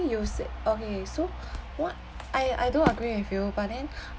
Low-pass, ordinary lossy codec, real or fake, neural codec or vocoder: none; none; real; none